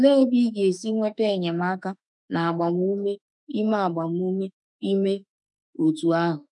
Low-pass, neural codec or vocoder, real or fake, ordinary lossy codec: 10.8 kHz; autoencoder, 48 kHz, 32 numbers a frame, DAC-VAE, trained on Japanese speech; fake; none